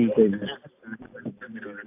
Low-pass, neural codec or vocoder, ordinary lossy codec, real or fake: 3.6 kHz; none; AAC, 32 kbps; real